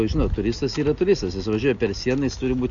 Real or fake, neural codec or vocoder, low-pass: real; none; 7.2 kHz